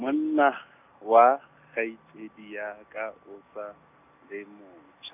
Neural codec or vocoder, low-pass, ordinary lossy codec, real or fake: none; 3.6 kHz; none; real